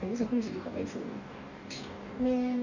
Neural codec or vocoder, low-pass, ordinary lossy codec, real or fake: codec, 44.1 kHz, 2.6 kbps, DAC; 7.2 kHz; none; fake